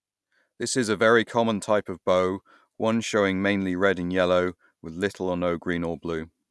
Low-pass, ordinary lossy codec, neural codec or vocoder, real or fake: none; none; none; real